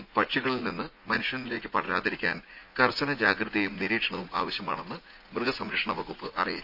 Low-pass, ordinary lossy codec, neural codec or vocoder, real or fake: 5.4 kHz; Opus, 64 kbps; vocoder, 44.1 kHz, 80 mel bands, Vocos; fake